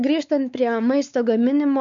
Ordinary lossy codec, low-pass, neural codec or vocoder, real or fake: MP3, 96 kbps; 7.2 kHz; codec, 16 kHz, 4 kbps, X-Codec, WavLM features, trained on Multilingual LibriSpeech; fake